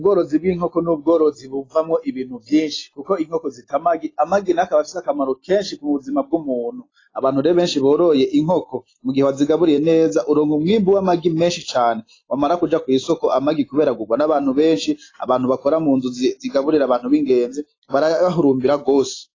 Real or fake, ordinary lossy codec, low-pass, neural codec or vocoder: real; AAC, 32 kbps; 7.2 kHz; none